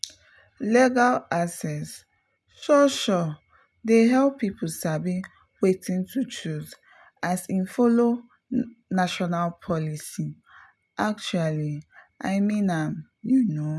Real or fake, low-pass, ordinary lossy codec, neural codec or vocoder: real; none; none; none